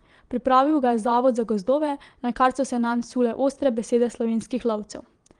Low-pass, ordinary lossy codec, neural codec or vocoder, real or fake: 9.9 kHz; Opus, 24 kbps; vocoder, 22.05 kHz, 80 mel bands, Vocos; fake